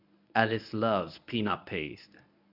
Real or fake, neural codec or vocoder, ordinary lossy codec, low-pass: fake; codec, 24 kHz, 0.9 kbps, WavTokenizer, medium speech release version 1; none; 5.4 kHz